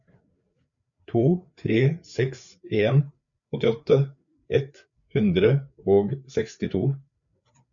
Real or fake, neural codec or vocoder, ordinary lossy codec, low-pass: fake; codec, 16 kHz, 4 kbps, FreqCodec, larger model; MP3, 96 kbps; 7.2 kHz